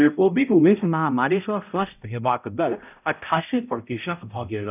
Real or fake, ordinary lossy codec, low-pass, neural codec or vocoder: fake; none; 3.6 kHz; codec, 16 kHz, 0.5 kbps, X-Codec, HuBERT features, trained on balanced general audio